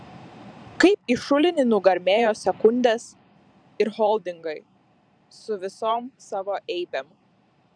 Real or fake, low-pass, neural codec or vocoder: fake; 9.9 kHz; vocoder, 44.1 kHz, 128 mel bands every 512 samples, BigVGAN v2